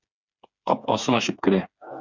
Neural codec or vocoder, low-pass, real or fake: codec, 16 kHz, 4 kbps, FreqCodec, smaller model; 7.2 kHz; fake